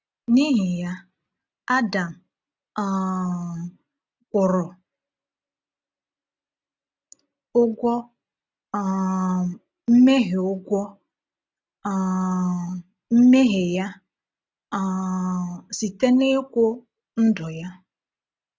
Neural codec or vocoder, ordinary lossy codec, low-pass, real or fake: none; none; none; real